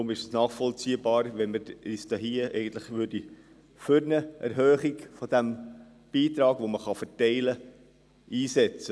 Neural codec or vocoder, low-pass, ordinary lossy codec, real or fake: none; none; none; real